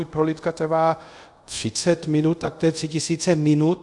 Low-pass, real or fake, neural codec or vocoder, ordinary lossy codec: 10.8 kHz; fake; codec, 24 kHz, 0.5 kbps, DualCodec; MP3, 64 kbps